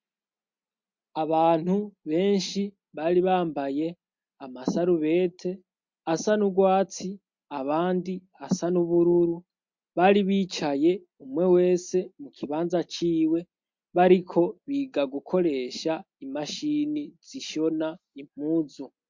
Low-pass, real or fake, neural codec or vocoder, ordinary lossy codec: 7.2 kHz; real; none; MP3, 48 kbps